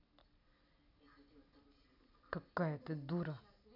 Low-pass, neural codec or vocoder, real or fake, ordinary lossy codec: 5.4 kHz; none; real; none